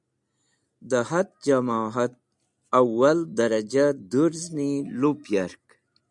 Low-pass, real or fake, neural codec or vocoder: 10.8 kHz; real; none